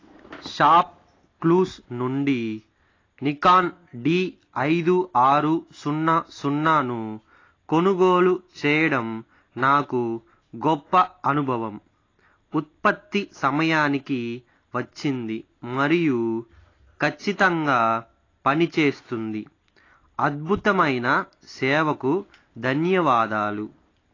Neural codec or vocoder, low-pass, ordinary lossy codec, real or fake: none; 7.2 kHz; AAC, 32 kbps; real